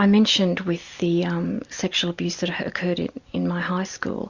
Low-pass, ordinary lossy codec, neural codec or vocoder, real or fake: 7.2 kHz; Opus, 64 kbps; none; real